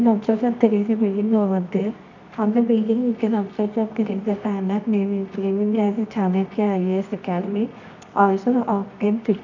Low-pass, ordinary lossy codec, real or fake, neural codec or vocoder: 7.2 kHz; none; fake; codec, 24 kHz, 0.9 kbps, WavTokenizer, medium music audio release